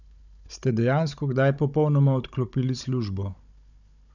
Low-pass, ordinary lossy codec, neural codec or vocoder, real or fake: 7.2 kHz; none; codec, 16 kHz, 16 kbps, FunCodec, trained on Chinese and English, 50 frames a second; fake